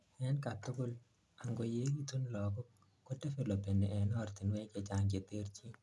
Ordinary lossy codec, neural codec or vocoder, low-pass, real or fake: none; none; none; real